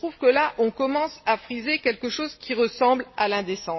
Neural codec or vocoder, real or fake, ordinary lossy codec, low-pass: none; real; MP3, 24 kbps; 7.2 kHz